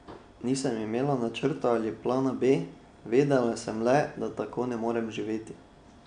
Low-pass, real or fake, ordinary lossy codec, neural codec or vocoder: 9.9 kHz; real; none; none